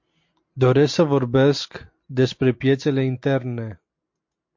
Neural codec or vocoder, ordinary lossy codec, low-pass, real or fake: none; MP3, 48 kbps; 7.2 kHz; real